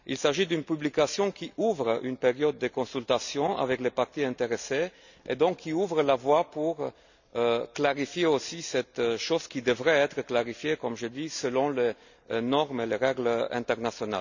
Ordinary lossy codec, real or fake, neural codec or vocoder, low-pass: none; real; none; 7.2 kHz